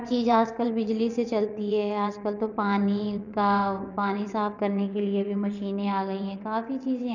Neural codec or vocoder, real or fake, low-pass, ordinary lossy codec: vocoder, 22.05 kHz, 80 mel bands, WaveNeXt; fake; 7.2 kHz; none